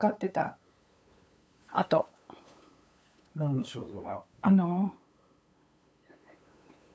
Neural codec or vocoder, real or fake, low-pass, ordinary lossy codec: codec, 16 kHz, 8 kbps, FunCodec, trained on LibriTTS, 25 frames a second; fake; none; none